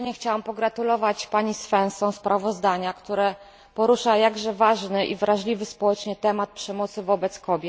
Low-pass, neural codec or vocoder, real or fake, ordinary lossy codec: none; none; real; none